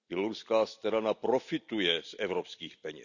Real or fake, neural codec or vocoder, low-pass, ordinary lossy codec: real; none; 7.2 kHz; none